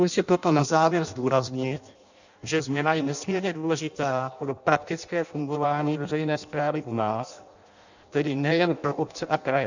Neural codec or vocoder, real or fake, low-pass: codec, 16 kHz in and 24 kHz out, 0.6 kbps, FireRedTTS-2 codec; fake; 7.2 kHz